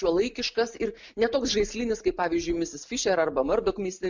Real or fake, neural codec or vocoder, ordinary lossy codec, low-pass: real; none; MP3, 64 kbps; 7.2 kHz